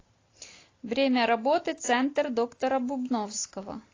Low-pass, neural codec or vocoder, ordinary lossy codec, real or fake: 7.2 kHz; none; AAC, 32 kbps; real